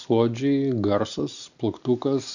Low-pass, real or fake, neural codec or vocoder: 7.2 kHz; real; none